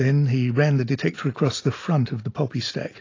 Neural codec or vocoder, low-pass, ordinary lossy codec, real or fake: none; 7.2 kHz; AAC, 32 kbps; real